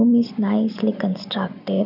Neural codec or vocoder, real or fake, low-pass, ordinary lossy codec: none; real; 5.4 kHz; none